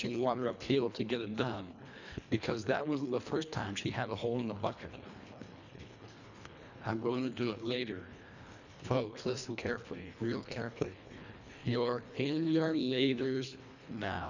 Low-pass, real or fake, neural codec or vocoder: 7.2 kHz; fake; codec, 24 kHz, 1.5 kbps, HILCodec